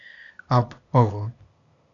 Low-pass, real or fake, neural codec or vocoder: 7.2 kHz; fake; codec, 16 kHz, 0.8 kbps, ZipCodec